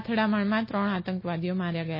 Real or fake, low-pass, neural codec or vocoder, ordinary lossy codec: real; 5.4 kHz; none; MP3, 24 kbps